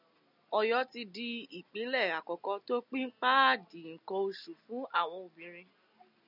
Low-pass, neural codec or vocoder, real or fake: 5.4 kHz; none; real